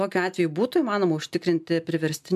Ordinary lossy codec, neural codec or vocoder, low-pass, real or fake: MP3, 96 kbps; none; 14.4 kHz; real